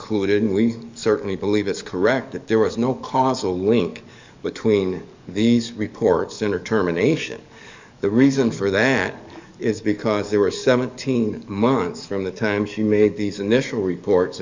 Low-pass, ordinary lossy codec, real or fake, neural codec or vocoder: 7.2 kHz; MP3, 64 kbps; fake; codec, 44.1 kHz, 7.8 kbps, DAC